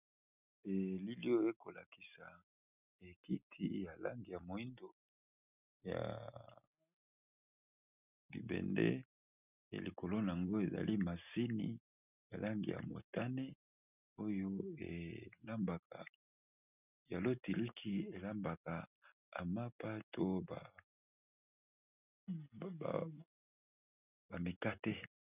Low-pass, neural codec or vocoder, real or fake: 3.6 kHz; none; real